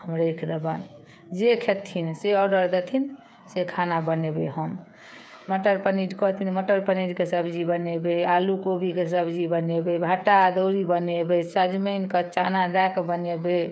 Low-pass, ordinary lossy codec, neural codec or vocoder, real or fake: none; none; codec, 16 kHz, 8 kbps, FreqCodec, smaller model; fake